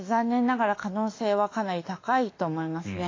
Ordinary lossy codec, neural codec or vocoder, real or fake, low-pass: AAC, 32 kbps; autoencoder, 48 kHz, 32 numbers a frame, DAC-VAE, trained on Japanese speech; fake; 7.2 kHz